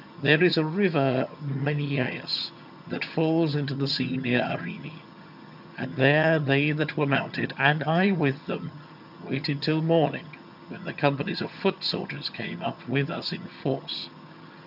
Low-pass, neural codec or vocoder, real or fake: 5.4 kHz; vocoder, 22.05 kHz, 80 mel bands, HiFi-GAN; fake